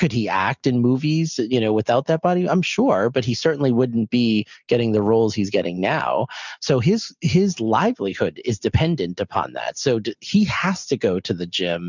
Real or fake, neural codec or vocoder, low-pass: real; none; 7.2 kHz